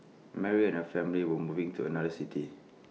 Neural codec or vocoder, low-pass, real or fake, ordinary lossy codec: none; none; real; none